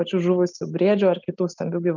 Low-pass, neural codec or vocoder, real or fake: 7.2 kHz; none; real